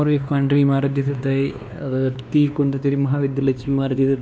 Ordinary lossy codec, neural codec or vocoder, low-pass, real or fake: none; codec, 16 kHz, 2 kbps, X-Codec, WavLM features, trained on Multilingual LibriSpeech; none; fake